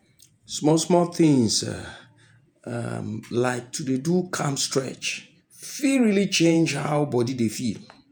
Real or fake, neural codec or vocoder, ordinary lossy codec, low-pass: fake; vocoder, 48 kHz, 128 mel bands, Vocos; none; none